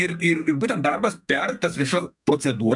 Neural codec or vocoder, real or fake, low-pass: codec, 32 kHz, 1.9 kbps, SNAC; fake; 10.8 kHz